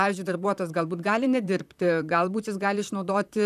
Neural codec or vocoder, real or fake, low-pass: vocoder, 44.1 kHz, 128 mel bands, Pupu-Vocoder; fake; 14.4 kHz